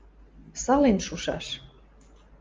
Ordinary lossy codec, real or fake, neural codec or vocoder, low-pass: Opus, 32 kbps; real; none; 7.2 kHz